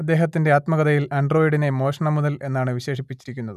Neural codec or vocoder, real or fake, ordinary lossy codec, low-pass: none; real; none; 14.4 kHz